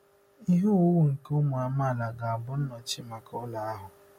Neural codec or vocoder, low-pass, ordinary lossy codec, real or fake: none; 19.8 kHz; MP3, 64 kbps; real